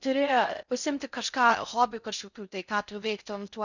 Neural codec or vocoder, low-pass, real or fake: codec, 16 kHz in and 24 kHz out, 0.6 kbps, FocalCodec, streaming, 4096 codes; 7.2 kHz; fake